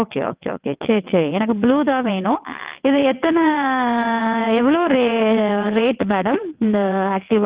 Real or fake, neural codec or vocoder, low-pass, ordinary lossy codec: fake; vocoder, 22.05 kHz, 80 mel bands, WaveNeXt; 3.6 kHz; Opus, 24 kbps